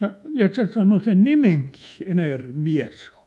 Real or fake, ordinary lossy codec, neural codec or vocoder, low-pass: fake; none; codec, 24 kHz, 1.2 kbps, DualCodec; none